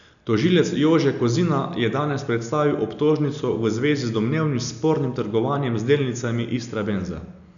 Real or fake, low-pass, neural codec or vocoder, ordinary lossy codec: real; 7.2 kHz; none; none